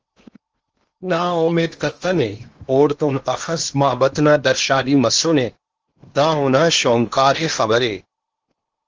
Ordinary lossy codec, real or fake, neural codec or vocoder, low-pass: Opus, 24 kbps; fake; codec, 16 kHz in and 24 kHz out, 0.8 kbps, FocalCodec, streaming, 65536 codes; 7.2 kHz